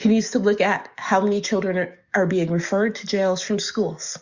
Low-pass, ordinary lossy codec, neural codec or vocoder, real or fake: 7.2 kHz; Opus, 64 kbps; codec, 44.1 kHz, 7.8 kbps, DAC; fake